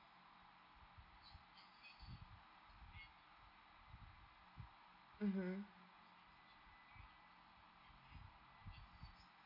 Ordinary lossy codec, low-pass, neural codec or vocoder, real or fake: none; 5.4 kHz; none; real